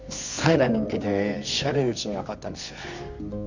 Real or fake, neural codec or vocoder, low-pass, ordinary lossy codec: fake; codec, 24 kHz, 0.9 kbps, WavTokenizer, medium music audio release; 7.2 kHz; none